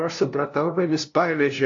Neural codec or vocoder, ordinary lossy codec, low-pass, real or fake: codec, 16 kHz, 0.5 kbps, FunCodec, trained on LibriTTS, 25 frames a second; AAC, 48 kbps; 7.2 kHz; fake